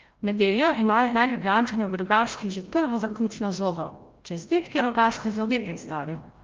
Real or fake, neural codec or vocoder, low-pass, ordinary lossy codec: fake; codec, 16 kHz, 0.5 kbps, FreqCodec, larger model; 7.2 kHz; Opus, 24 kbps